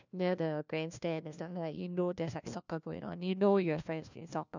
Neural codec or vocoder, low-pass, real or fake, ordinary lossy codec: codec, 16 kHz, 1 kbps, FunCodec, trained on LibriTTS, 50 frames a second; 7.2 kHz; fake; none